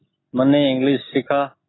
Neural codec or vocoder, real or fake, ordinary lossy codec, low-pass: none; real; AAC, 16 kbps; 7.2 kHz